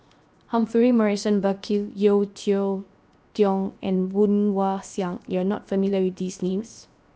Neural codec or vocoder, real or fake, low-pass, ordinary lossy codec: codec, 16 kHz, 0.7 kbps, FocalCodec; fake; none; none